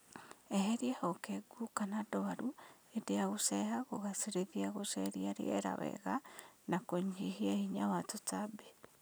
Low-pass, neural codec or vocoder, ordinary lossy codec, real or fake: none; none; none; real